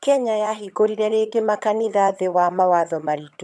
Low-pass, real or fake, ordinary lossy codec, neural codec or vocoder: none; fake; none; vocoder, 22.05 kHz, 80 mel bands, HiFi-GAN